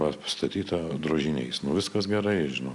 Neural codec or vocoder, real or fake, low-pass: none; real; 10.8 kHz